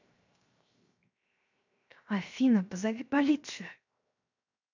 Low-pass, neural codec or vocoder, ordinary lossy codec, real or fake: 7.2 kHz; codec, 16 kHz, 0.7 kbps, FocalCodec; MP3, 64 kbps; fake